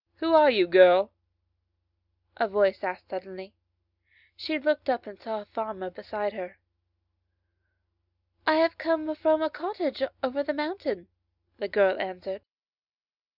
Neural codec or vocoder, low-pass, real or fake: none; 5.4 kHz; real